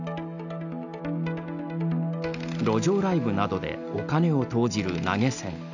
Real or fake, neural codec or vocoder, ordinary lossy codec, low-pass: real; none; none; 7.2 kHz